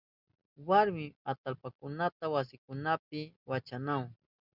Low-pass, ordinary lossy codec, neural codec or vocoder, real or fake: 5.4 kHz; Opus, 64 kbps; none; real